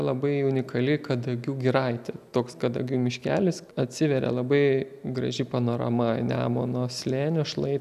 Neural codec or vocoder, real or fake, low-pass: none; real; 14.4 kHz